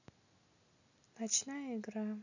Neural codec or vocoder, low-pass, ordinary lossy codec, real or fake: none; 7.2 kHz; none; real